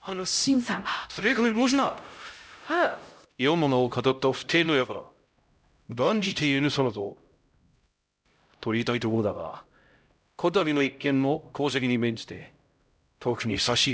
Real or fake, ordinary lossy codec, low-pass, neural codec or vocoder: fake; none; none; codec, 16 kHz, 0.5 kbps, X-Codec, HuBERT features, trained on LibriSpeech